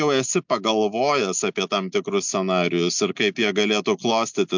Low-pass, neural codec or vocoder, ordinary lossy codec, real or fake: 7.2 kHz; none; MP3, 64 kbps; real